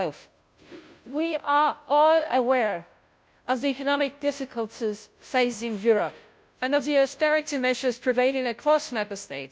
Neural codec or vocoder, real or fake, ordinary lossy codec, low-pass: codec, 16 kHz, 0.5 kbps, FunCodec, trained on Chinese and English, 25 frames a second; fake; none; none